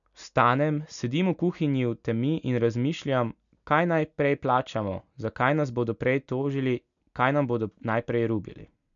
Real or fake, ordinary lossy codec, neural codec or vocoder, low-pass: real; none; none; 7.2 kHz